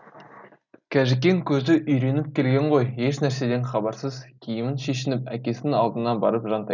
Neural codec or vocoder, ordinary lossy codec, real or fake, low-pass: none; none; real; 7.2 kHz